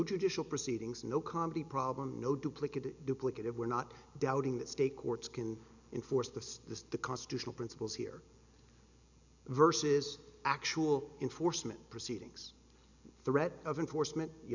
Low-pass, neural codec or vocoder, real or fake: 7.2 kHz; none; real